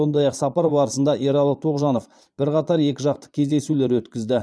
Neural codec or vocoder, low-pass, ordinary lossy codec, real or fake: vocoder, 22.05 kHz, 80 mel bands, Vocos; none; none; fake